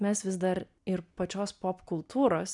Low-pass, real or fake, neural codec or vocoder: 10.8 kHz; real; none